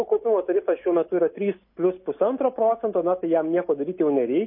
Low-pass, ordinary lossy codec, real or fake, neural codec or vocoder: 5.4 kHz; MP3, 24 kbps; real; none